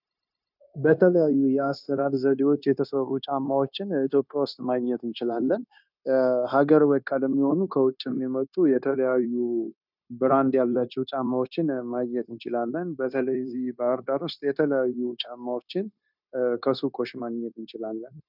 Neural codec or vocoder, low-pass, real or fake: codec, 16 kHz, 0.9 kbps, LongCat-Audio-Codec; 5.4 kHz; fake